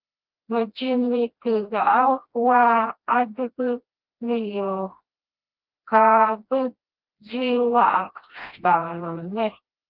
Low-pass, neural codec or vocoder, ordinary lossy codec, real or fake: 5.4 kHz; codec, 16 kHz, 1 kbps, FreqCodec, smaller model; Opus, 32 kbps; fake